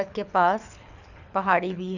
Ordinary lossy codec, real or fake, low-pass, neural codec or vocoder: none; fake; 7.2 kHz; codec, 24 kHz, 6 kbps, HILCodec